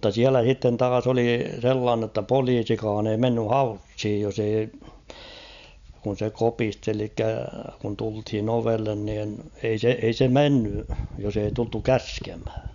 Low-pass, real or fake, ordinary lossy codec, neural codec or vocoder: 7.2 kHz; real; none; none